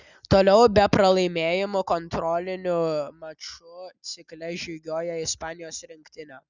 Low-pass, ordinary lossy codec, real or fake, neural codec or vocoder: 7.2 kHz; Opus, 64 kbps; real; none